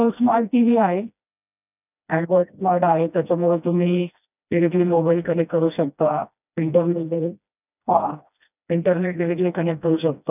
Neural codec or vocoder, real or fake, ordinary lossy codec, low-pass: codec, 16 kHz, 1 kbps, FreqCodec, smaller model; fake; none; 3.6 kHz